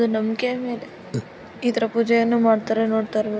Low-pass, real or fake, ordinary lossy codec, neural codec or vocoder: none; real; none; none